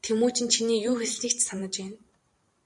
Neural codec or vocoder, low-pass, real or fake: vocoder, 44.1 kHz, 128 mel bands every 512 samples, BigVGAN v2; 10.8 kHz; fake